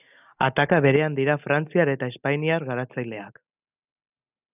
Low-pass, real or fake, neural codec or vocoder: 3.6 kHz; real; none